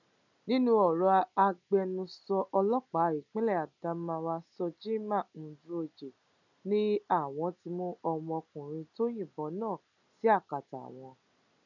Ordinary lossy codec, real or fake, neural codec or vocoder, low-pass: none; real; none; 7.2 kHz